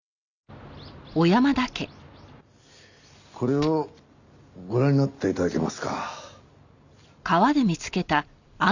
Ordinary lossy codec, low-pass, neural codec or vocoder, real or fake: none; 7.2 kHz; none; real